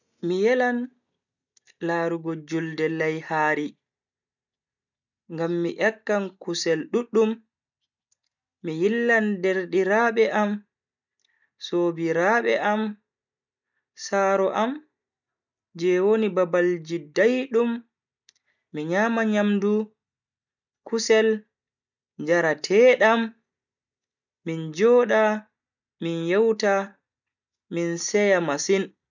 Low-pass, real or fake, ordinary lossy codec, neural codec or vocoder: 7.2 kHz; real; none; none